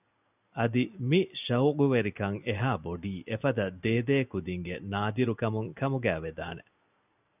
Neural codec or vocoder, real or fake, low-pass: none; real; 3.6 kHz